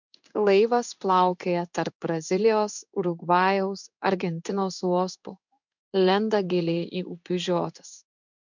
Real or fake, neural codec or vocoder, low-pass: fake; codec, 16 kHz in and 24 kHz out, 1 kbps, XY-Tokenizer; 7.2 kHz